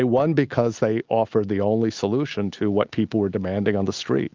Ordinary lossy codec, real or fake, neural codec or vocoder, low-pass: Opus, 24 kbps; real; none; 7.2 kHz